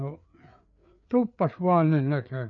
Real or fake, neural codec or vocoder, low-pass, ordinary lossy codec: fake; codec, 16 kHz, 4 kbps, FreqCodec, larger model; 7.2 kHz; none